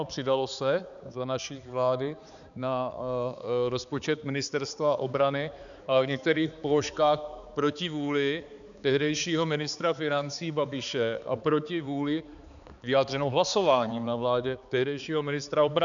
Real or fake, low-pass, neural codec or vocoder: fake; 7.2 kHz; codec, 16 kHz, 4 kbps, X-Codec, HuBERT features, trained on balanced general audio